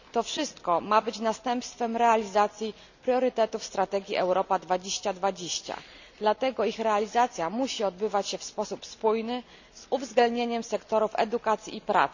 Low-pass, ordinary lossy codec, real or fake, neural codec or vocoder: 7.2 kHz; none; real; none